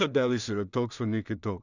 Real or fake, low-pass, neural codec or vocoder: fake; 7.2 kHz; codec, 16 kHz in and 24 kHz out, 0.4 kbps, LongCat-Audio-Codec, two codebook decoder